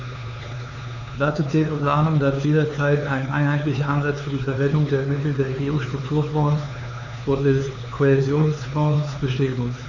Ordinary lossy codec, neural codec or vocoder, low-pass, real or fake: none; codec, 16 kHz, 4 kbps, X-Codec, HuBERT features, trained on LibriSpeech; 7.2 kHz; fake